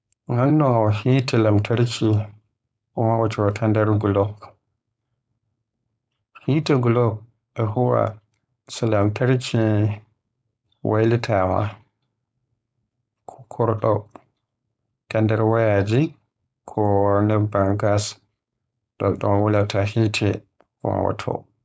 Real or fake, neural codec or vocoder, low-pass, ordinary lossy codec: fake; codec, 16 kHz, 4.8 kbps, FACodec; none; none